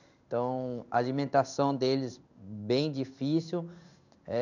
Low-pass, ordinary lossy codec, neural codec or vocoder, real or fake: 7.2 kHz; none; codec, 16 kHz in and 24 kHz out, 1 kbps, XY-Tokenizer; fake